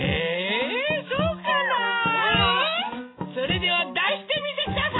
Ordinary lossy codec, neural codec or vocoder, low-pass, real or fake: AAC, 16 kbps; none; 7.2 kHz; real